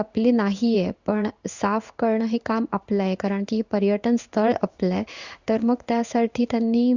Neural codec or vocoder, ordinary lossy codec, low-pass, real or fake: codec, 16 kHz in and 24 kHz out, 1 kbps, XY-Tokenizer; Opus, 64 kbps; 7.2 kHz; fake